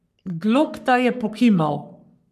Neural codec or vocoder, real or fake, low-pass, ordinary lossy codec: codec, 44.1 kHz, 3.4 kbps, Pupu-Codec; fake; 14.4 kHz; none